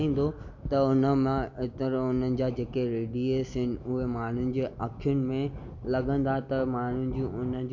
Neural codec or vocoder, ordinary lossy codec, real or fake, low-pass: none; none; real; 7.2 kHz